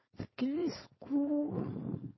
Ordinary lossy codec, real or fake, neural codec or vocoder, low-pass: MP3, 24 kbps; fake; codec, 16 kHz, 4.8 kbps, FACodec; 7.2 kHz